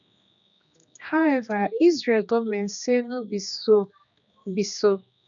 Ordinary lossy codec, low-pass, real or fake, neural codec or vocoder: none; 7.2 kHz; fake; codec, 16 kHz, 2 kbps, X-Codec, HuBERT features, trained on general audio